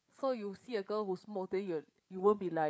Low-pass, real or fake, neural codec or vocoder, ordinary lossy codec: none; fake; codec, 16 kHz, 8 kbps, FreqCodec, larger model; none